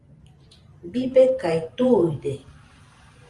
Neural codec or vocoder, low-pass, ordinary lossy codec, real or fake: vocoder, 44.1 kHz, 128 mel bands every 512 samples, BigVGAN v2; 10.8 kHz; Opus, 32 kbps; fake